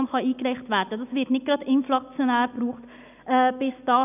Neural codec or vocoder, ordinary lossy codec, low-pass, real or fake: none; none; 3.6 kHz; real